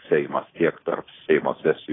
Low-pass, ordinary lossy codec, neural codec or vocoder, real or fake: 7.2 kHz; AAC, 16 kbps; codec, 24 kHz, 3.1 kbps, DualCodec; fake